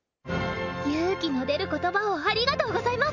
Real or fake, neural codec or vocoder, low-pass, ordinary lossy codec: real; none; 7.2 kHz; none